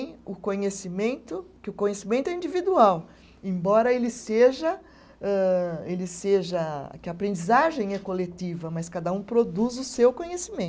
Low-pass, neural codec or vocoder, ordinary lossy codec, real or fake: none; none; none; real